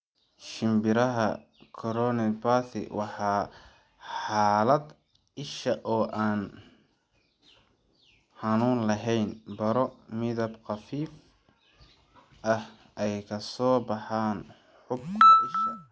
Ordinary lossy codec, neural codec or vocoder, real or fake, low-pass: none; none; real; none